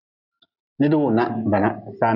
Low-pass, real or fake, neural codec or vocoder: 5.4 kHz; real; none